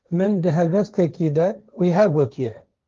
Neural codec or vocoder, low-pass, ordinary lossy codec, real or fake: codec, 16 kHz, 1.1 kbps, Voila-Tokenizer; 7.2 kHz; Opus, 32 kbps; fake